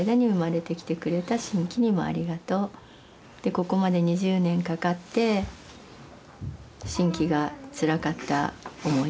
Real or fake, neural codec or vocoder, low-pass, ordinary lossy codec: real; none; none; none